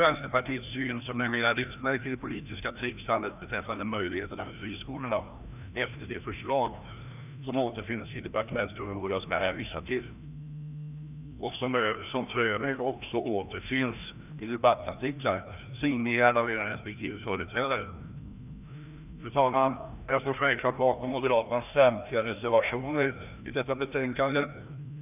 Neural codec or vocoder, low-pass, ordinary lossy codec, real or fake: codec, 16 kHz, 1 kbps, FreqCodec, larger model; 3.6 kHz; none; fake